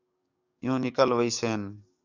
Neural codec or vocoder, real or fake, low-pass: codec, 44.1 kHz, 7.8 kbps, DAC; fake; 7.2 kHz